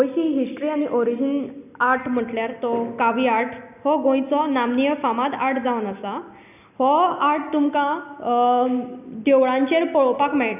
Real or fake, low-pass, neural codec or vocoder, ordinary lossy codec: real; 3.6 kHz; none; none